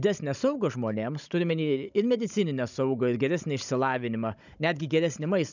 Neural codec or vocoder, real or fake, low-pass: codec, 16 kHz, 16 kbps, FunCodec, trained on Chinese and English, 50 frames a second; fake; 7.2 kHz